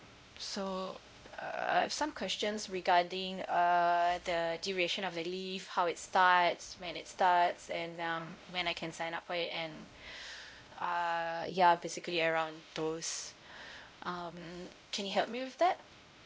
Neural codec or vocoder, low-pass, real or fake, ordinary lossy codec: codec, 16 kHz, 0.5 kbps, X-Codec, WavLM features, trained on Multilingual LibriSpeech; none; fake; none